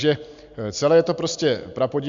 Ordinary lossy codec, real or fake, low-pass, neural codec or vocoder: Opus, 64 kbps; real; 7.2 kHz; none